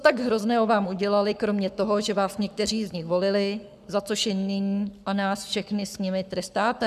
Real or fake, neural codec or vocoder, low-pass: fake; codec, 44.1 kHz, 7.8 kbps, Pupu-Codec; 14.4 kHz